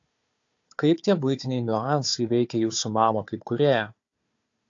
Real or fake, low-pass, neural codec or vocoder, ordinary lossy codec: fake; 7.2 kHz; codec, 16 kHz, 4 kbps, FunCodec, trained on Chinese and English, 50 frames a second; AAC, 48 kbps